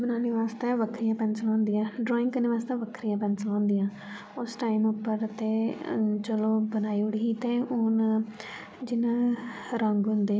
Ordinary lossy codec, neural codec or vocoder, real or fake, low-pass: none; none; real; none